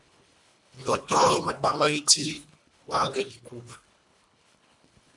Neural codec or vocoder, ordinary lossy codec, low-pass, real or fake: codec, 24 kHz, 1.5 kbps, HILCodec; MP3, 64 kbps; 10.8 kHz; fake